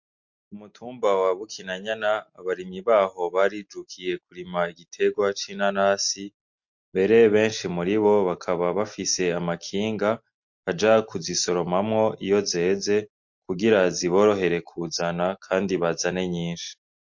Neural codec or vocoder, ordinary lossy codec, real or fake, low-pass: none; MP3, 48 kbps; real; 7.2 kHz